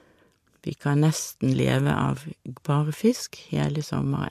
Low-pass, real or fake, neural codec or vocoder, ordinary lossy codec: 14.4 kHz; real; none; MP3, 64 kbps